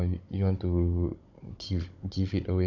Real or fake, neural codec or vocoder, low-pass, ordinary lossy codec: fake; codec, 16 kHz, 16 kbps, FunCodec, trained on Chinese and English, 50 frames a second; 7.2 kHz; none